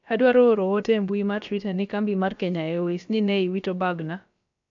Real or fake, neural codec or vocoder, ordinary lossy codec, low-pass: fake; codec, 16 kHz, about 1 kbps, DyCAST, with the encoder's durations; AAC, 64 kbps; 7.2 kHz